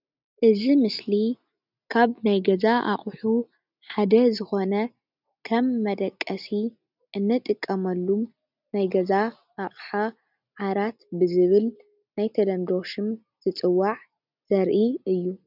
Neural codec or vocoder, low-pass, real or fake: none; 5.4 kHz; real